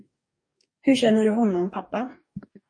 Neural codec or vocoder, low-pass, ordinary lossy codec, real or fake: codec, 44.1 kHz, 2.6 kbps, DAC; 9.9 kHz; MP3, 48 kbps; fake